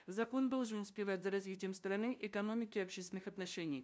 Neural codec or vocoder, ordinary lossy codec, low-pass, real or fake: codec, 16 kHz, 0.5 kbps, FunCodec, trained on LibriTTS, 25 frames a second; none; none; fake